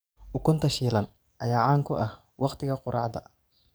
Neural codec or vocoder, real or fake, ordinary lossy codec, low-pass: codec, 44.1 kHz, 7.8 kbps, DAC; fake; none; none